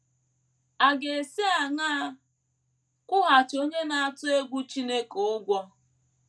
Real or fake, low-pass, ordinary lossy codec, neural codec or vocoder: real; none; none; none